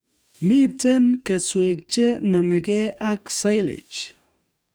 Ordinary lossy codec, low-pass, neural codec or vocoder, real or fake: none; none; codec, 44.1 kHz, 2.6 kbps, DAC; fake